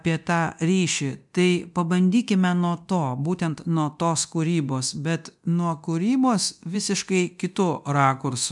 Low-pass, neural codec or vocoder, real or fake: 10.8 kHz; codec, 24 kHz, 0.9 kbps, DualCodec; fake